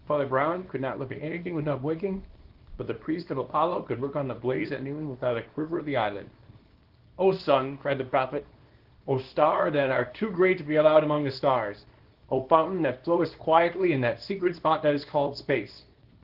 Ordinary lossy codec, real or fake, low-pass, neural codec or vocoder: Opus, 16 kbps; fake; 5.4 kHz; codec, 24 kHz, 0.9 kbps, WavTokenizer, small release